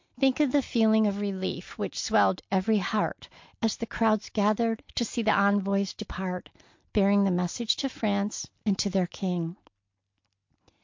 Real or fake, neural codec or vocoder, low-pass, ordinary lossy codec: real; none; 7.2 kHz; MP3, 48 kbps